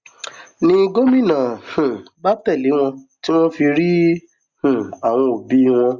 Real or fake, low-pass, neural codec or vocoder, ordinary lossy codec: real; 7.2 kHz; none; Opus, 64 kbps